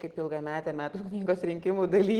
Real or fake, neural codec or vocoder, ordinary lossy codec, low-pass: real; none; Opus, 16 kbps; 19.8 kHz